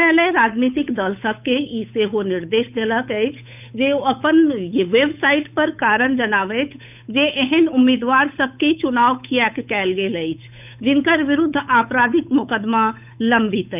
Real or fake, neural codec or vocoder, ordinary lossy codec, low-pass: fake; codec, 16 kHz, 8 kbps, FunCodec, trained on Chinese and English, 25 frames a second; none; 3.6 kHz